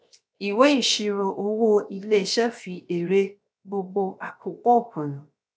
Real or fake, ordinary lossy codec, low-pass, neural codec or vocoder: fake; none; none; codec, 16 kHz, 0.7 kbps, FocalCodec